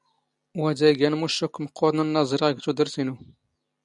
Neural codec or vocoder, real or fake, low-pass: none; real; 10.8 kHz